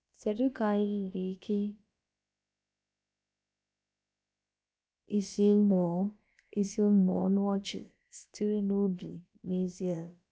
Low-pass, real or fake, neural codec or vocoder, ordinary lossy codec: none; fake; codec, 16 kHz, about 1 kbps, DyCAST, with the encoder's durations; none